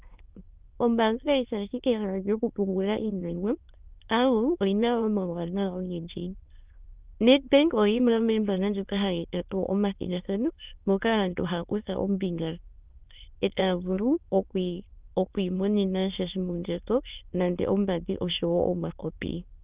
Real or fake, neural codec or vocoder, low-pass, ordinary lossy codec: fake; autoencoder, 22.05 kHz, a latent of 192 numbers a frame, VITS, trained on many speakers; 3.6 kHz; Opus, 32 kbps